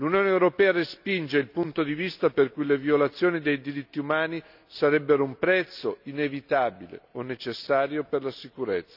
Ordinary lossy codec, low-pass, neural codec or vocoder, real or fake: none; 5.4 kHz; none; real